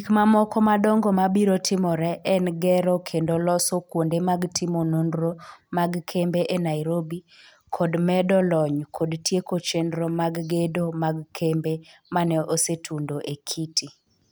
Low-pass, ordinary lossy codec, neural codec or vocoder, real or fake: none; none; none; real